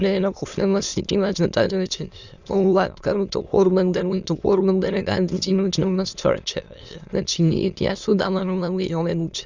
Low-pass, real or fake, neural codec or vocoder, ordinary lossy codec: 7.2 kHz; fake; autoencoder, 22.05 kHz, a latent of 192 numbers a frame, VITS, trained on many speakers; Opus, 64 kbps